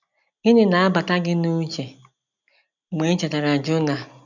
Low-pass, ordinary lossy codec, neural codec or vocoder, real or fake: 7.2 kHz; none; none; real